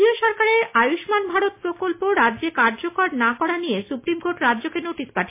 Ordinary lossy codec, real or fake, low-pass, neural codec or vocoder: MP3, 24 kbps; real; 3.6 kHz; none